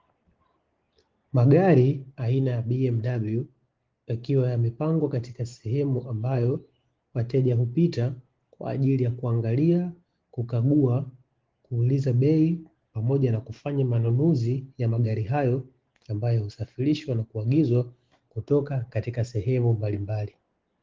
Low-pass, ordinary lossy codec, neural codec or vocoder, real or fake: 7.2 kHz; Opus, 16 kbps; none; real